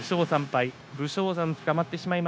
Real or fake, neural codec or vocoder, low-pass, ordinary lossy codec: fake; codec, 16 kHz, 0.9 kbps, LongCat-Audio-Codec; none; none